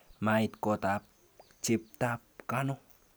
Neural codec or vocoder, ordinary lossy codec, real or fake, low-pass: none; none; real; none